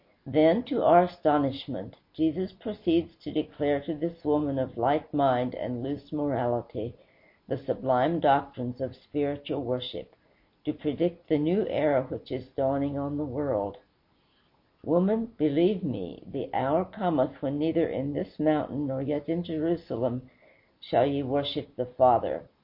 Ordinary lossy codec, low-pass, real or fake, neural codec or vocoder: MP3, 32 kbps; 5.4 kHz; real; none